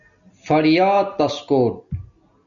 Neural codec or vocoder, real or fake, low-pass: none; real; 7.2 kHz